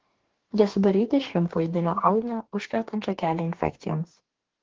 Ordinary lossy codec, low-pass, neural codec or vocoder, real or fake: Opus, 16 kbps; 7.2 kHz; codec, 44.1 kHz, 2.6 kbps, DAC; fake